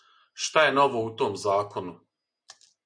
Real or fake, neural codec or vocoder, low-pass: real; none; 9.9 kHz